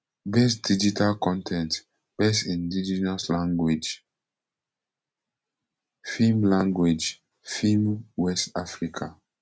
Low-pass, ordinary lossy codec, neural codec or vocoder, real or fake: none; none; none; real